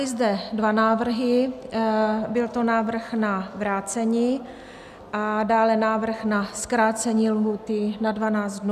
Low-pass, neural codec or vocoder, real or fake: 14.4 kHz; none; real